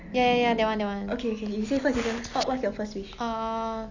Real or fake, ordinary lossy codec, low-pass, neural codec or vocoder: real; none; 7.2 kHz; none